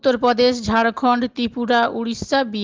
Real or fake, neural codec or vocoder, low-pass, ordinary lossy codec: real; none; 7.2 kHz; Opus, 16 kbps